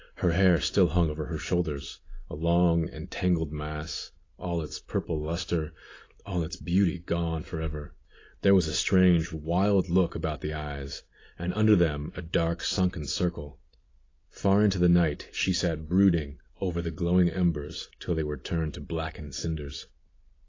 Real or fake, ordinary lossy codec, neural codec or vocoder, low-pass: real; AAC, 32 kbps; none; 7.2 kHz